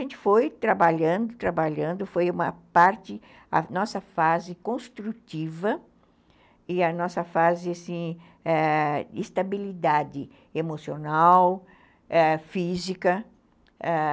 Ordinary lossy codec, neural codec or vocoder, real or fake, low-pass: none; none; real; none